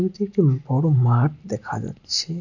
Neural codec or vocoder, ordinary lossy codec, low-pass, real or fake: none; none; 7.2 kHz; real